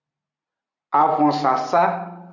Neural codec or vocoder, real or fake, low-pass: none; real; 7.2 kHz